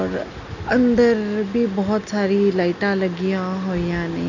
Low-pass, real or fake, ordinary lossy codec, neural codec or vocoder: 7.2 kHz; real; none; none